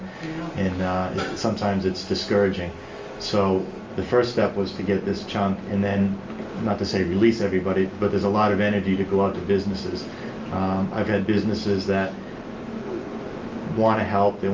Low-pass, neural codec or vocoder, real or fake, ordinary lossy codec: 7.2 kHz; none; real; Opus, 32 kbps